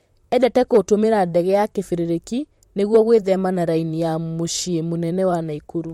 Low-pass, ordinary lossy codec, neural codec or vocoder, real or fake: 19.8 kHz; MP3, 64 kbps; vocoder, 44.1 kHz, 128 mel bands, Pupu-Vocoder; fake